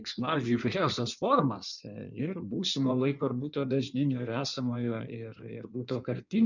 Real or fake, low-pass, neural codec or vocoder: fake; 7.2 kHz; codec, 16 kHz in and 24 kHz out, 1.1 kbps, FireRedTTS-2 codec